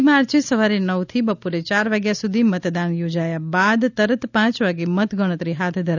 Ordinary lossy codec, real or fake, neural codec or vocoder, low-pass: none; real; none; 7.2 kHz